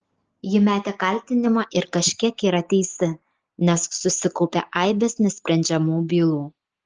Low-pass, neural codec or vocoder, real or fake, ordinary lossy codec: 7.2 kHz; none; real; Opus, 16 kbps